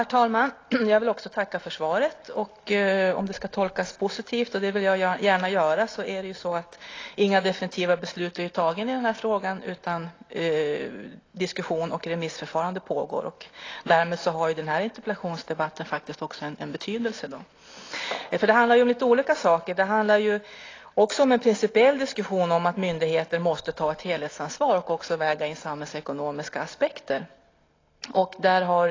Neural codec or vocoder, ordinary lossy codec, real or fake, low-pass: none; AAC, 32 kbps; real; 7.2 kHz